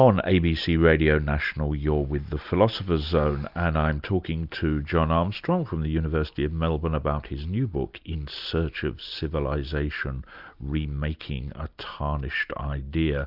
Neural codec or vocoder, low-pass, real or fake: none; 5.4 kHz; real